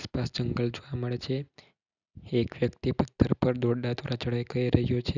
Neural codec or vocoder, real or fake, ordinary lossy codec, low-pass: none; real; none; 7.2 kHz